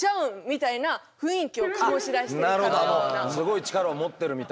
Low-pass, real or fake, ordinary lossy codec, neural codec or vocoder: none; real; none; none